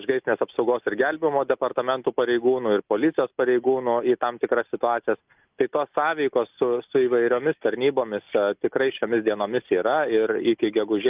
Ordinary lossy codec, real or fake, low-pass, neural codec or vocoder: Opus, 24 kbps; real; 3.6 kHz; none